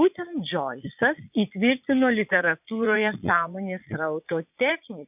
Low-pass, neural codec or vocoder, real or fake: 3.6 kHz; vocoder, 22.05 kHz, 80 mel bands, Vocos; fake